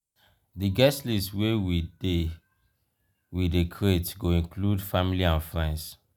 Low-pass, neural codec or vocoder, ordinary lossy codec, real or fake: none; none; none; real